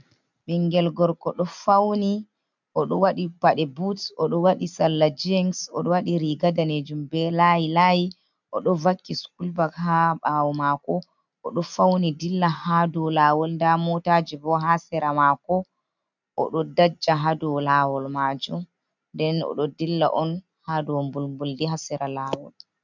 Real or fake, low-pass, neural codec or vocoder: real; 7.2 kHz; none